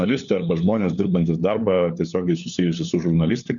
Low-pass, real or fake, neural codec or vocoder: 7.2 kHz; fake; codec, 16 kHz, 4 kbps, FunCodec, trained on LibriTTS, 50 frames a second